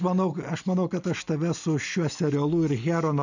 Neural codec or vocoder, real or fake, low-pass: none; real; 7.2 kHz